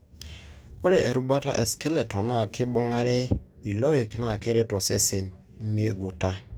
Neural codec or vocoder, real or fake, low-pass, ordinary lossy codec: codec, 44.1 kHz, 2.6 kbps, DAC; fake; none; none